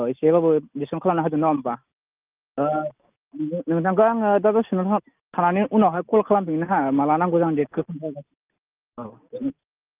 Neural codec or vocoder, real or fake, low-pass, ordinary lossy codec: none; real; 3.6 kHz; Opus, 24 kbps